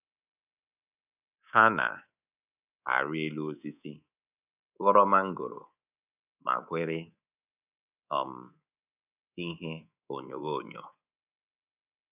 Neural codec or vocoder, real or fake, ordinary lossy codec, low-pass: codec, 24 kHz, 3.1 kbps, DualCodec; fake; none; 3.6 kHz